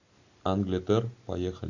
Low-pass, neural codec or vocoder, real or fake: 7.2 kHz; none; real